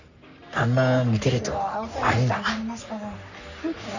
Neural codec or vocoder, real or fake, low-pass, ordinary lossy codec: codec, 44.1 kHz, 3.4 kbps, Pupu-Codec; fake; 7.2 kHz; none